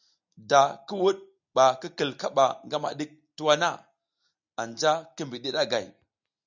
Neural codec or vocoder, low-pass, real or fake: none; 7.2 kHz; real